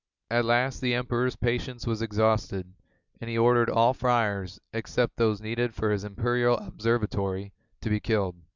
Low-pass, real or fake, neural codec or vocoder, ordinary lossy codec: 7.2 kHz; real; none; Opus, 64 kbps